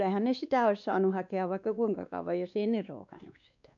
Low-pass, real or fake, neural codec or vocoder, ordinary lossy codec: 7.2 kHz; fake; codec, 16 kHz, 2 kbps, X-Codec, WavLM features, trained on Multilingual LibriSpeech; none